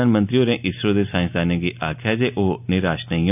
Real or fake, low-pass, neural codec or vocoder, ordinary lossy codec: real; 3.6 kHz; none; none